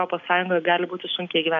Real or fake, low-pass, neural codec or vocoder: real; 7.2 kHz; none